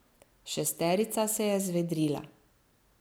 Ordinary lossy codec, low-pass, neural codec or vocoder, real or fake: none; none; none; real